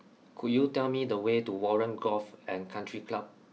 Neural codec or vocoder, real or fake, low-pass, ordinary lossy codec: none; real; none; none